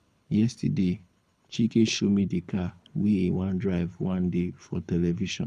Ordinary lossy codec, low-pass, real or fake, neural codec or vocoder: none; none; fake; codec, 24 kHz, 6 kbps, HILCodec